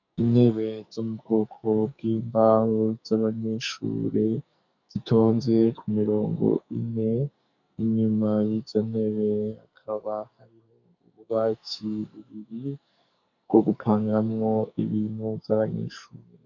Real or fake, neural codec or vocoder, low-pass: fake; codec, 44.1 kHz, 2.6 kbps, SNAC; 7.2 kHz